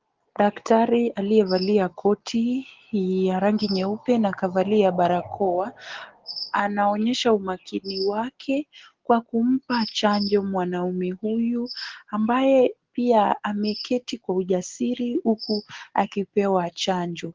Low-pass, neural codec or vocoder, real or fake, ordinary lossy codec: 7.2 kHz; none; real; Opus, 16 kbps